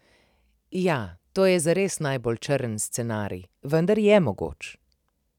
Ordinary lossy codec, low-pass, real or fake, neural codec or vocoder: none; 19.8 kHz; real; none